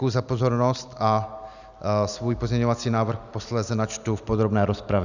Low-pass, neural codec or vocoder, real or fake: 7.2 kHz; none; real